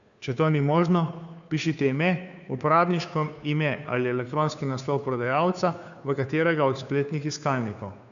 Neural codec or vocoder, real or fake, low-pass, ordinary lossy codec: codec, 16 kHz, 2 kbps, FunCodec, trained on Chinese and English, 25 frames a second; fake; 7.2 kHz; none